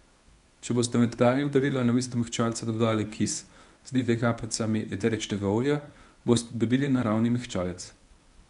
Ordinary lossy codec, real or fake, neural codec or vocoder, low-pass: none; fake; codec, 24 kHz, 0.9 kbps, WavTokenizer, medium speech release version 1; 10.8 kHz